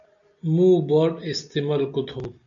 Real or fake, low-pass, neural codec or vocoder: real; 7.2 kHz; none